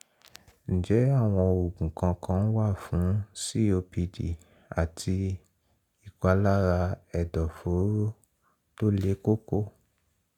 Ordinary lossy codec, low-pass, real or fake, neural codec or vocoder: none; 19.8 kHz; real; none